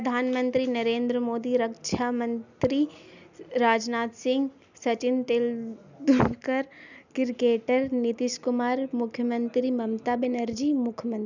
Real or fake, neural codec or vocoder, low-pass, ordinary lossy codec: real; none; 7.2 kHz; none